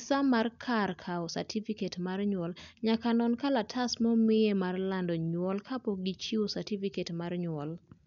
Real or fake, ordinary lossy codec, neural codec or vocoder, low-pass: real; none; none; 7.2 kHz